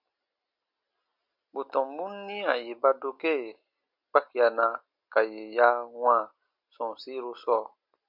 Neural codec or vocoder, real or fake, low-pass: none; real; 5.4 kHz